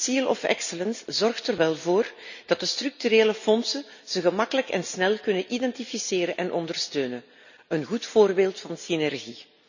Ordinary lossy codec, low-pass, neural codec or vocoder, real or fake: none; 7.2 kHz; none; real